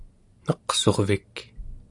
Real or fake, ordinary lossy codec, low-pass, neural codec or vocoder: real; AAC, 64 kbps; 10.8 kHz; none